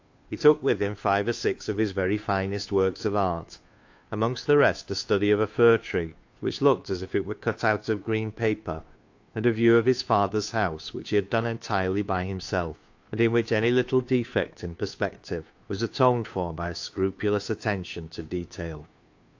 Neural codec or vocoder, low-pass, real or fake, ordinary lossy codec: codec, 16 kHz, 2 kbps, FunCodec, trained on Chinese and English, 25 frames a second; 7.2 kHz; fake; AAC, 48 kbps